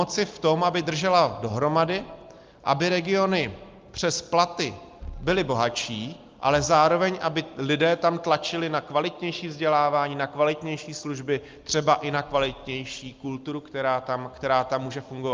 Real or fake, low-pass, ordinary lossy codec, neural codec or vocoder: real; 7.2 kHz; Opus, 32 kbps; none